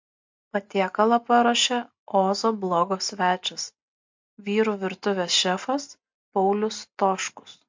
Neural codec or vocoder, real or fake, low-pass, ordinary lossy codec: none; real; 7.2 kHz; MP3, 48 kbps